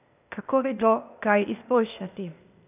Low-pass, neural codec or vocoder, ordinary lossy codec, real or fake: 3.6 kHz; codec, 16 kHz, 0.8 kbps, ZipCodec; none; fake